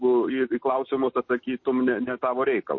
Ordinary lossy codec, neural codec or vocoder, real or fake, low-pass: MP3, 32 kbps; none; real; 7.2 kHz